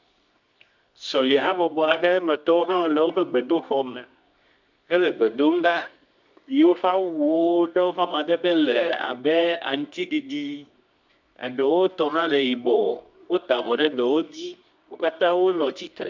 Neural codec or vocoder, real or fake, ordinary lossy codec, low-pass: codec, 24 kHz, 0.9 kbps, WavTokenizer, medium music audio release; fake; MP3, 64 kbps; 7.2 kHz